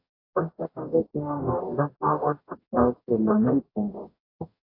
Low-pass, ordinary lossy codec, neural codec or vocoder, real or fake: 5.4 kHz; AAC, 32 kbps; codec, 44.1 kHz, 0.9 kbps, DAC; fake